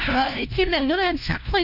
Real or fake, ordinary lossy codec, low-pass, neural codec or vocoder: fake; none; 5.4 kHz; codec, 16 kHz, 1 kbps, FunCodec, trained on LibriTTS, 50 frames a second